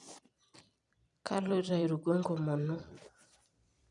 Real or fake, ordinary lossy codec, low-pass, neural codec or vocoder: fake; none; 10.8 kHz; vocoder, 44.1 kHz, 128 mel bands every 256 samples, BigVGAN v2